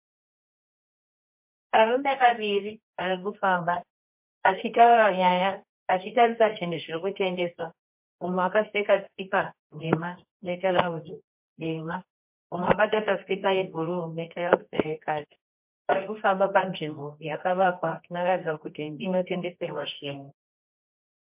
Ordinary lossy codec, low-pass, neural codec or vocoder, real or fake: MP3, 32 kbps; 3.6 kHz; codec, 24 kHz, 0.9 kbps, WavTokenizer, medium music audio release; fake